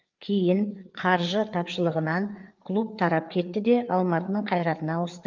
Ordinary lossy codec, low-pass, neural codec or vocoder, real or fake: Opus, 24 kbps; 7.2 kHz; codec, 16 kHz, 4 kbps, FreqCodec, larger model; fake